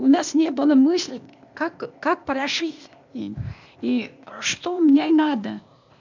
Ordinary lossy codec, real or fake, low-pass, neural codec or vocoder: MP3, 64 kbps; fake; 7.2 kHz; codec, 16 kHz, 0.8 kbps, ZipCodec